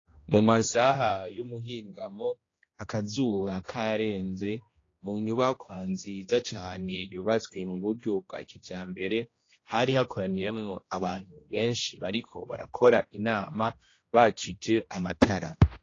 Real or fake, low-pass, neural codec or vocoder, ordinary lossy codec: fake; 7.2 kHz; codec, 16 kHz, 1 kbps, X-Codec, HuBERT features, trained on general audio; AAC, 32 kbps